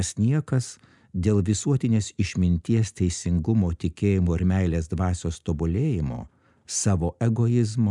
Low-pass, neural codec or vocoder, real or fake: 10.8 kHz; none; real